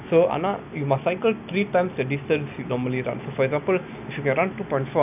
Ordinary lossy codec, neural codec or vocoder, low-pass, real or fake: none; none; 3.6 kHz; real